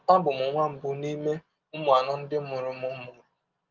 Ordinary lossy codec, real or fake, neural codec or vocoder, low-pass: Opus, 32 kbps; real; none; 7.2 kHz